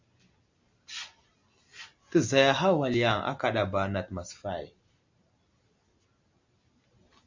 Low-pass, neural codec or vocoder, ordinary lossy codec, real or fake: 7.2 kHz; none; MP3, 64 kbps; real